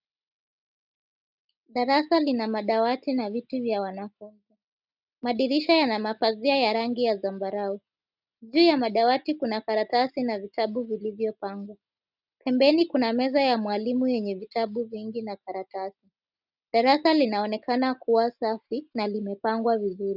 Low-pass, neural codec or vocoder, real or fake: 5.4 kHz; none; real